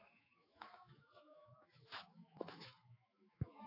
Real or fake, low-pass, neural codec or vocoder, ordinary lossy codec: fake; 5.4 kHz; autoencoder, 48 kHz, 128 numbers a frame, DAC-VAE, trained on Japanese speech; AAC, 48 kbps